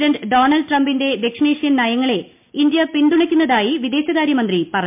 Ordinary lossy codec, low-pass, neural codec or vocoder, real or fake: MP3, 32 kbps; 3.6 kHz; none; real